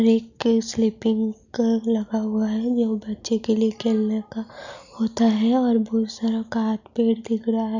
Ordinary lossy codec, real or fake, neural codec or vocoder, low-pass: none; real; none; 7.2 kHz